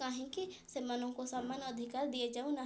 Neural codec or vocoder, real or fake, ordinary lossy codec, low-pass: none; real; none; none